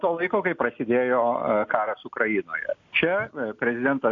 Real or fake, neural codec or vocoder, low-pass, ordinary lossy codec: real; none; 7.2 kHz; AAC, 48 kbps